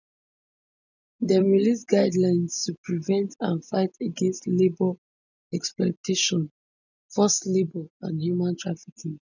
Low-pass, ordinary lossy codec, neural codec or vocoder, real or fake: 7.2 kHz; none; none; real